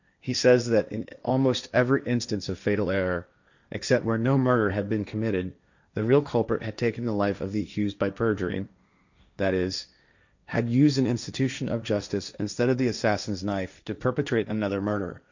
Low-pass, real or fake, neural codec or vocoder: 7.2 kHz; fake; codec, 16 kHz, 1.1 kbps, Voila-Tokenizer